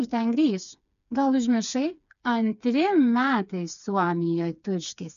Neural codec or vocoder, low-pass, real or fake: codec, 16 kHz, 4 kbps, FreqCodec, smaller model; 7.2 kHz; fake